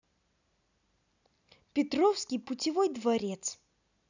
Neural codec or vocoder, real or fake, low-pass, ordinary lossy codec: none; real; 7.2 kHz; none